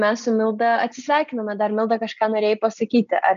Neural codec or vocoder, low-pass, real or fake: none; 7.2 kHz; real